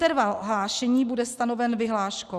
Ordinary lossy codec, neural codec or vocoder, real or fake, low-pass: Opus, 64 kbps; none; real; 14.4 kHz